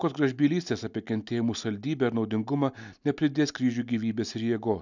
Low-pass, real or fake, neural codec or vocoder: 7.2 kHz; real; none